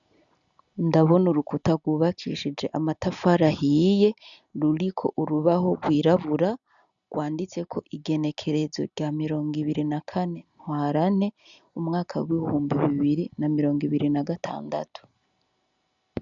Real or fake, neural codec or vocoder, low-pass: real; none; 7.2 kHz